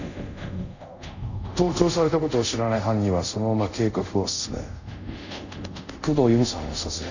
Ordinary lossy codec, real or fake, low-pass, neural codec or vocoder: none; fake; 7.2 kHz; codec, 24 kHz, 0.5 kbps, DualCodec